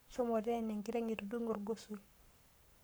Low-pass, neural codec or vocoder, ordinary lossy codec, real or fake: none; codec, 44.1 kHz, 7.8 kbps, Pupu-Codec; none; fake